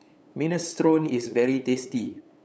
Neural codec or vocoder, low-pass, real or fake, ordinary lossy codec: codec, 16 kHz, 8 kbps, FunCodec, trained on LibriTTS, 25 frames a second; none; fake; none